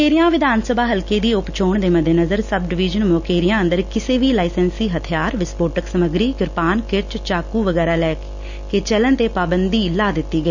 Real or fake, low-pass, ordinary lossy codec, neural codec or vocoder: real; 7.2 kHz; none; none